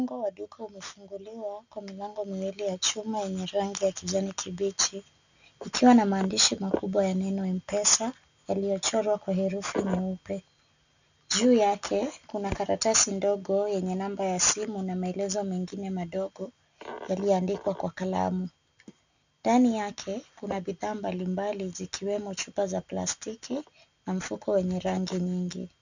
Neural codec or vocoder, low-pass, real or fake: none; 7.2 kHz; real